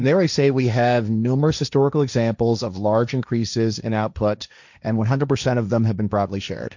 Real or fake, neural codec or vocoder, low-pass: fake; codec, 16 kHz, 1.1 kbps, Voila-Tokenizer; 7.2 kHz